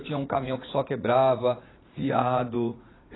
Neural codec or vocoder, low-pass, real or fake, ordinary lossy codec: vocoder, 44.1 kHz, 80 mel bands, Vocos; 7.2 kHz; fake; AAC, 16 kbps